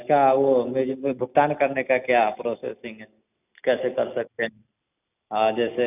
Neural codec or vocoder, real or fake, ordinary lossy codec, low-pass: none; real; none; 3.6 kHz